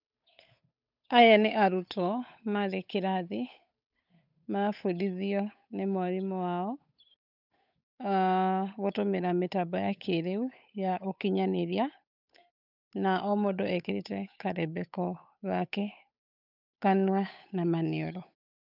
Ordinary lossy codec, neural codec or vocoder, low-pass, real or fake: none; codec, 16 kHz, 8 kbps, FunCodec, trained on Chinese and English, 25 frames a second; 5.4 kHz; fake